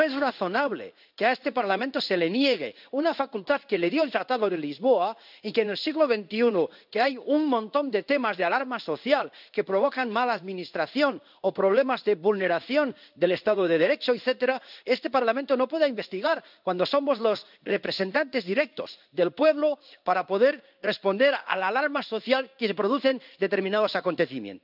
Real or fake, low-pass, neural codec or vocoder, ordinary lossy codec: fake; 5.4 kHz; codec, 16 kHz in and 24 kHz out, 1 kbps, XY-Tokenizer; none